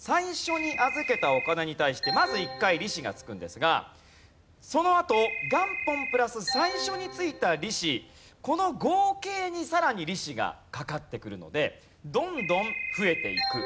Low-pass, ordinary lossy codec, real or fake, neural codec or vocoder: none; none; real; none